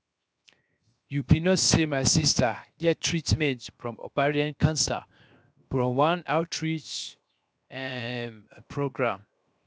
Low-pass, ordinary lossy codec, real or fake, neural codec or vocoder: none; none; fake; codec, 16 kHz, 0.7 kbps, FocalCodec